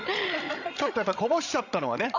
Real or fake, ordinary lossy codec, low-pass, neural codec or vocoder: fake; AAC, 48 kbps; 7.2 kHz; codec, 16 kHz, 16 kbps, FreqCodec, larger model